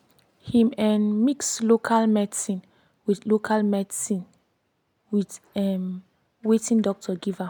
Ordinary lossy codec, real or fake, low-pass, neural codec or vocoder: none; real; 19.8 kHz; none